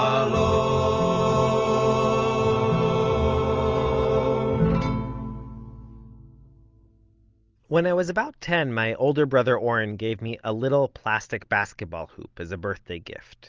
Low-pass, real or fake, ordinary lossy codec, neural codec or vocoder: 7.2 kHz; real; Opus, 24 kbps; none